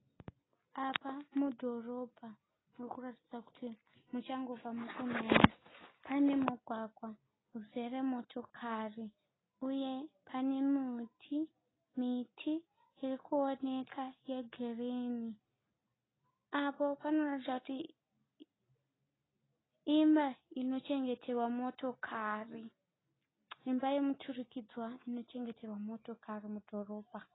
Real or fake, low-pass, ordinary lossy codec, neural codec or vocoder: real; 7.2 kHz; AAC, 16 kbps; none